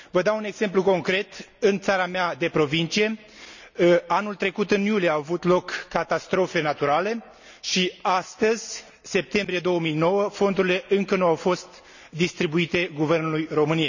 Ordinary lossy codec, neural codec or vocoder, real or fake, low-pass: none; none; real; 7.2 kHz